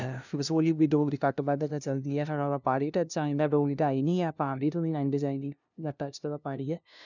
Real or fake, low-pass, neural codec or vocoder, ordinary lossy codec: fake; 7.2 kHz; codec, 16 kHz, 0.5 kbps, FunCodec, trained on LibriTTS, 25 frames a second; none